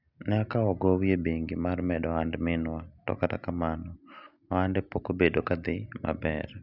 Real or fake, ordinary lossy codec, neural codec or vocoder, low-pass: real; none; none; 5.4 kHz